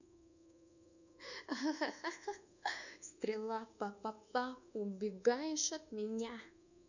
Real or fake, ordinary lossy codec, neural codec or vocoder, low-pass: fake; none; codec, 24 kHz, 1.2 kbps, DualCodec; 7.2 kHz